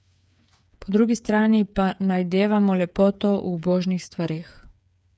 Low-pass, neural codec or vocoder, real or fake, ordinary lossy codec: none; codec, 16 kHz, 8 kbps, FreqCodec, smaller model; fake; none